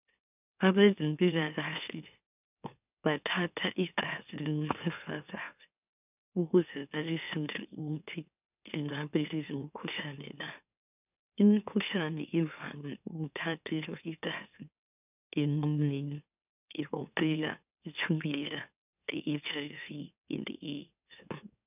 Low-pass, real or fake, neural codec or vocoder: 3.6 kHz; fake; autoencoder, 44.1 kHz, a latent of 192 numbers a frame, MeloTTS